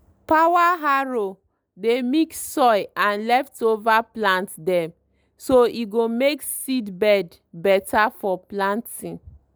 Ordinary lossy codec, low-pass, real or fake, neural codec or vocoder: none; none; real; none